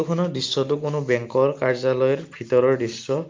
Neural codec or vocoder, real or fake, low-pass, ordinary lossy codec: none; real; 7.2 kHz; Opus, 24 kbps